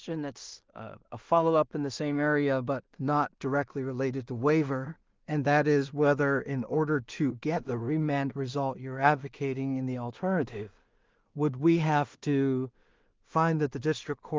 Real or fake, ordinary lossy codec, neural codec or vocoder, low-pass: fake; Opus, 32 kbps; codec, 16 kHz in and 24 kHz out, 0.4 kbps, LongCat-Audio-Codec, two codebook decoder; 7.2 kHz